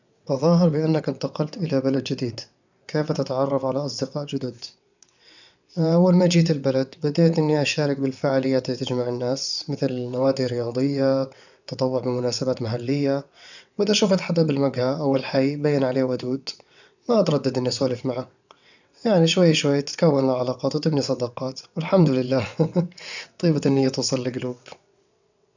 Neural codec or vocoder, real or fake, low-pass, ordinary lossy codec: vocoder, 22.05 kHz, 80 mel bands, WaveNeXt; fake; 7.2 kHz; none